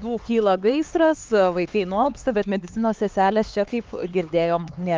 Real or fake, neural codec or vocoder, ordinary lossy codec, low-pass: fake; codec, 16 kHz, 4 kbps, X-Codec, HuBERT features, trained on LibriSpeech; Opus, 24 kbps; 7.2 kHz